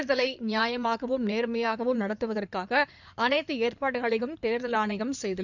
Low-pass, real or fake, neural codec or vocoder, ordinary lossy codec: 7.2 kHz; fake; codec, 16 kHz in and 24 kHz out, 2.2 kbps, FireRedTTS-2 codec; none